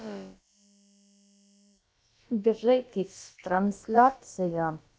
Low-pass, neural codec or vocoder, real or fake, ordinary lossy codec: none; codec, 16 kHz, about 1 kbps, DyCAST, with the encoder's durations; fake; none